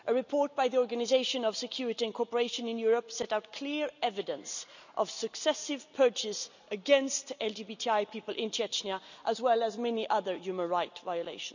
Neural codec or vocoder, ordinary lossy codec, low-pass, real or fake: none; none; 7.2 kHz; real